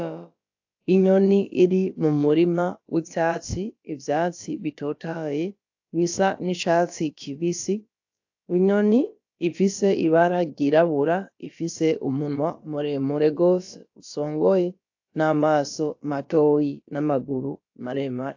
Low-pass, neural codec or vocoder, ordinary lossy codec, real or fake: 7.2 kHz; codec, 16 kHz, about 1 kbps, DyCAST, with the encoder's durations; AAC, 48 kbps; fake